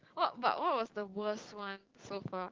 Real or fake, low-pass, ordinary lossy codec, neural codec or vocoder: fake; 7.2 kHz; Opus, 16 kbps; codec, 16 kHz, 6 kbps, DAC